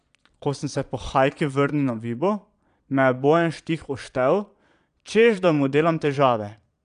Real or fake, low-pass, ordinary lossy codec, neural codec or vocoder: fake; 9.9 kHz; none; vocoder, 22.05 kHz, 80 mel bands, Vocos